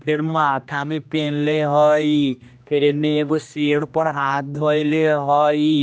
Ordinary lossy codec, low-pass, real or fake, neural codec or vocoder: none; none; fake; codec, 16 kHz, 1 kbps, X-Codec, HuBERT features, trained on general audio